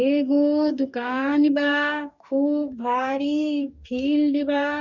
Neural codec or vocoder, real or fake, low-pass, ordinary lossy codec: codec, 44.1 kHz, 2.6 kbps, DAC; fake; 7.2 kHz; none